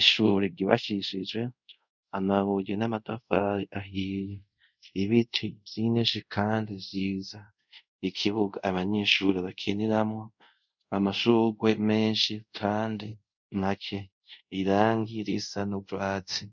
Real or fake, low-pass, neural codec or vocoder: fake; 7.2 kHz; codec, 24 kHz, 0.5 kbps, DualCodec